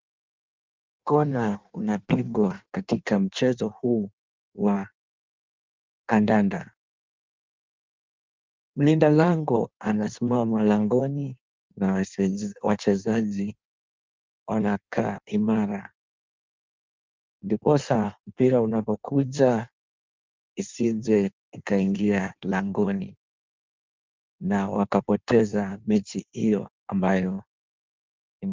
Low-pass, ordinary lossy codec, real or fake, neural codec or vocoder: 7.2 kHz; Opus, 32 kbps; fake; codec, 16 kHz in and 24 kHz out, 1.1 kbps, FireRedTTS-2 codec